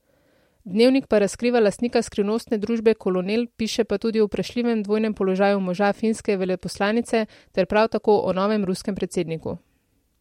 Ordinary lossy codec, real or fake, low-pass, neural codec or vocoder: MP3, 64 kbps; real; 19.8 kHz; none